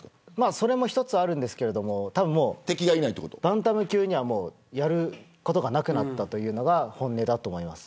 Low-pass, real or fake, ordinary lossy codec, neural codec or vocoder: none; real; none; none